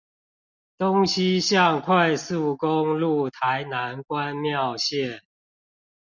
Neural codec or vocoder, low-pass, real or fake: none; 7.2 kHz; real